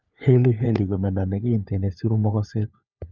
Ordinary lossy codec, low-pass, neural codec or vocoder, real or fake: none; 7.2 kHz; codec, 16 kHz, 4 kbps, FunCodec, trained on LibriTTS, 50 frames a second; fake